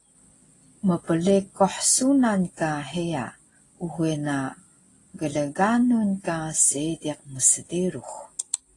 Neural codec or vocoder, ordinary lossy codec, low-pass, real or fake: none; AAC, 32 kbps; 10.8 kHz; real